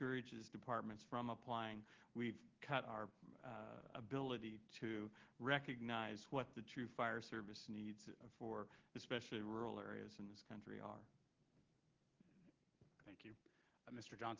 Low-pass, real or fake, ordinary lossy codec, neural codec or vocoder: 7.2 kHz; real; Opus, 16 kbps; none